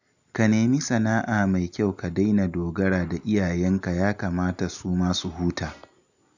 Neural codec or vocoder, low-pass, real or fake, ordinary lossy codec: none; 7.2 kHz; real; none